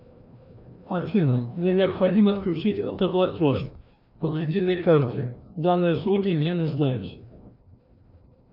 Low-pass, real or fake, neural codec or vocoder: 5.4 kHz; fake; codec, 16 kHz, 1 kbps, FreqCodec, larger model